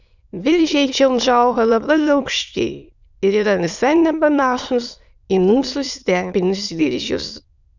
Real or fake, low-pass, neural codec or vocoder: fake; 7.2 kHz; autoencoder, 22.05 kHz, a latent of 192 numbers a frame, VITS, trained on many speakers